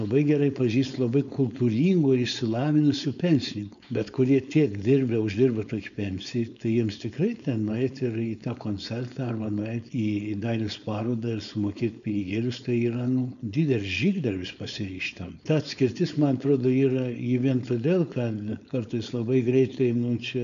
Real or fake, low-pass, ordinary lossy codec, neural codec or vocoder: fake; 7.2 kHz; MP3, 96 kbps; codec, 16 kHz, 4.8 kbps, FACodec